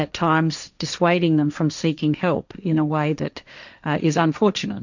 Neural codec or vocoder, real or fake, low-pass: codec, 16 kHz, 1.1 kbps, Voila-Tokenizer; fake; 7.2 kHz